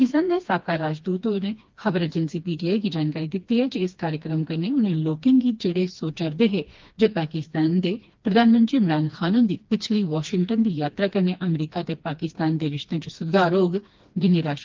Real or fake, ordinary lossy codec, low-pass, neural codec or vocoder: fake; Opus, 32 kbps; 7.2 kHz; codec, 16 kHz, 2 kbps, FreqCodec, smaller model